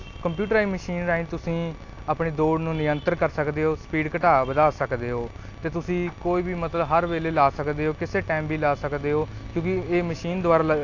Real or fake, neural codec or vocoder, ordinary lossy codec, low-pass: real; none; AAC, 48 kbps; 7.2 kHz